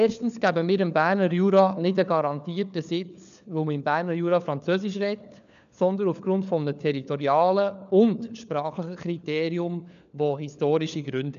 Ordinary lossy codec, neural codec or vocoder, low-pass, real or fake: none; codec, 16 kHz, 4 kbps, FunCodec, trained on LibriTTS, 50 frames a second; 7.2 kHz; fake